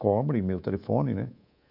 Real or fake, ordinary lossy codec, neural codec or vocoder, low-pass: fake; none; codec, 24 kHz, 3.1 kbps, DualCodec; 5.4 kHz